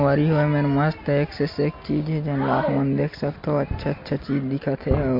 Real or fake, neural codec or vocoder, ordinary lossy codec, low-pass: real; none; MP3, 32 kbps; 5.4 kHz